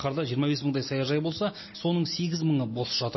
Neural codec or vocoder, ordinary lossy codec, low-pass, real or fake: none; MP3, 24 kbps; 7.2 kHz; real